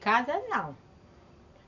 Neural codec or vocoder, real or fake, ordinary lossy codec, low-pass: none; real; none; 7.2 kHz